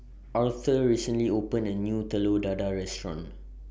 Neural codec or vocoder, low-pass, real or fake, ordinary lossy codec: none; none; real; none